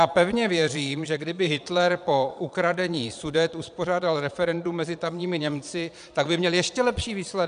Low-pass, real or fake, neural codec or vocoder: 9.9 kHz; fake; vocoder, 22.05 kHz, 80 mel bands, Vocos